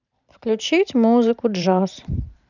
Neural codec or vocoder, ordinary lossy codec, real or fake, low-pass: vocoder, 44.1 kHz, 128 mel bands every 512 samples, BigVGAN v2; none; fake; 7.2 kHz